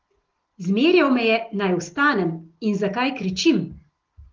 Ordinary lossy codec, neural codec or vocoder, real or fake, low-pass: Opus, 16 kbps; none; real; 7.2 kHz